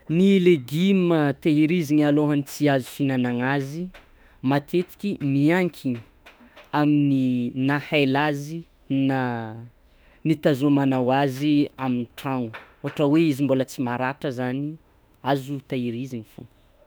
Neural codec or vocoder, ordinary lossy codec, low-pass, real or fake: autoencoder, 48 kHz, 32 numbers a frame, DAC-VAE, trained on Japanese speech; none; none; fake